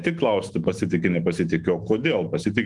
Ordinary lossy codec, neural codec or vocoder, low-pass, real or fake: Opus, 24 kbps; autoencoder, 48 kHz, 128 numbers a frame, DAC-VAE, trained on Japanese speech; 10.8 kHz; fake